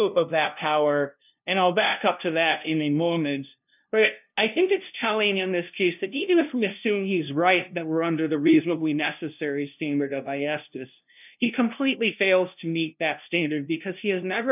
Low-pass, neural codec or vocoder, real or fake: 3.6 kHz; codec, 16 kHz, 0.5 kbps, FunCodec, trained on LibriTTS, 25 frames a second; fake